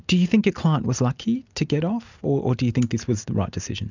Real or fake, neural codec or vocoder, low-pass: real; none; 7.2 kHz